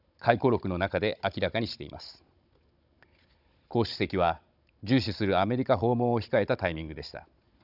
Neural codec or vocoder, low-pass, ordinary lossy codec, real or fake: codec, 16 kHz, 16 kbps, FunCodec, trained on Chinese and English, 50 frames a second; 5.4 kHz; none; fake